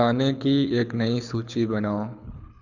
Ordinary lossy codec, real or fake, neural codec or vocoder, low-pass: none; fake; codec, 24 kHz, 6 kbps, HILCodec; 7.2 kHz